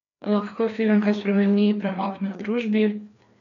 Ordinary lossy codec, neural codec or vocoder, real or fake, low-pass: none; codec, 16 kHz, 2 kbps, FreqCodec, larger model; fake; 7.2 kHz